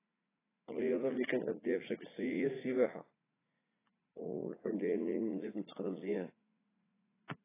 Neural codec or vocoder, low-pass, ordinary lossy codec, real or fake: vocoder, 44.1 kHz, 80 mel bands, Vocos; 3.6 kHz; AAC, 16 kbps; fake